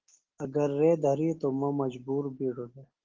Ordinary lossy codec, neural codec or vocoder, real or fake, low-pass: Opus, 16 kbps; none; real; 7.2 kHz